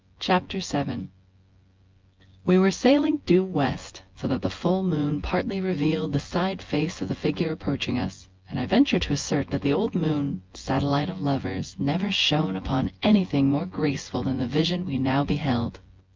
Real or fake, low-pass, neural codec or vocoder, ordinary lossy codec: fake; 7.2 kHz; vocoder, 24 kHz, 100 mel bands, Vocos; Opus, 32 kbps